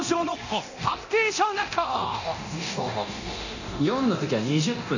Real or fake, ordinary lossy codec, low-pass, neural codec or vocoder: fake; none; 7.2 kHz; codec, 24 kHz, 0.9 kbps, DualCodec